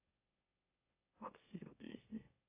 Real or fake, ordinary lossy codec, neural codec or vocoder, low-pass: fake; AAC, 32 kbps; autoencoder, 44.1 kHz, a latent of 192 numbers a frame, MeloTTS; 3.6 kHz